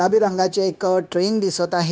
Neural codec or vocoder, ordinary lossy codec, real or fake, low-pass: codec, 16 kHz, 0.9 kbps, LongCat-Audio-Codec; none; fake; none